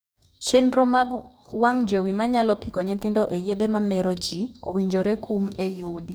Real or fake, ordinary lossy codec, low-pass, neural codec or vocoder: fake; none; none; codec, 44.1 kHz, 2.6 kbps, DAC